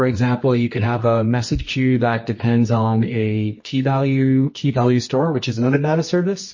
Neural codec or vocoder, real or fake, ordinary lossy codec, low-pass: codec, 24 kHz, 0.9 kbps, WavTokenizer, medium music audio release; fake; MP3, 32 kbps; 7.2 kHz